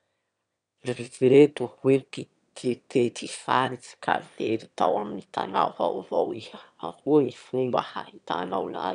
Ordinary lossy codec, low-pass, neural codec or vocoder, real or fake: none; 9.9 kHz; autoencoder, 22.05 kHz, a latent of 192 numbers a frame, VITS, trained on one speaker; fake